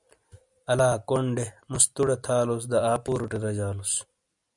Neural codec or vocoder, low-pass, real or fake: none; 10.8 kHz; real